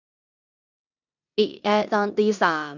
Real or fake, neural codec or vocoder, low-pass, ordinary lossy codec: fake; codec, 16 kHz in and 24 kHz out, 0.9 kbps, LongCat-Audio-Codec, four codebook decoder; 7.2 kHz; none